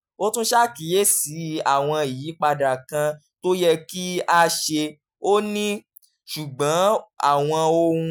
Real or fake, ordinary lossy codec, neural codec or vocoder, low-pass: real; none; none; none